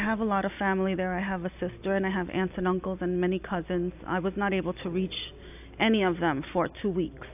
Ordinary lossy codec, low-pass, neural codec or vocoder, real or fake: AAC, 32 kbps; 3.6 kHz; none; real